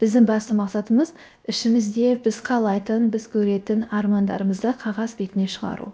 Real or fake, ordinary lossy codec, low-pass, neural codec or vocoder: fake; none; none; codec, 16 kHz, 0.7 kbps, FocalCodec